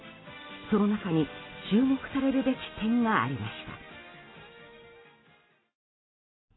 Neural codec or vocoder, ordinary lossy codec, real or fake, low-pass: none; AAC, 16 kbps; real; 7.2 kHz